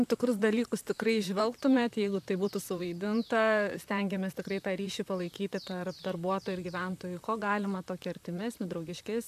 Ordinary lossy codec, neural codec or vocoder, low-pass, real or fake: AAC, 96 kbps; vocoder, 44.1 kHz, 128 mel bands, Pupu-Vocoder; 14.4 kHz; fake